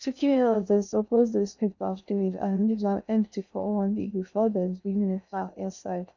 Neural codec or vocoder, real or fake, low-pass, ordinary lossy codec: codec, 16 kHz in and 24 kHz out, 0.6 kbps, FocalCodec, streaming, 2048 codes; fake; 7.2 kHz; none